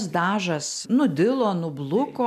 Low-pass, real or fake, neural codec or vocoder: 14.4 kHz; real; none